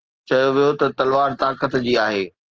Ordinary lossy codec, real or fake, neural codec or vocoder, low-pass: Opus, 16 kbps; real; none; 7.2 kHz